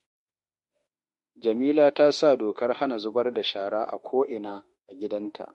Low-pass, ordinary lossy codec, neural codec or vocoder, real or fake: 14.4 kHz; MP3, 48 kbps; autoencoder, 48 kHz, 32 numbers a frame, DAC-VAE, trained on Japanese speech; fake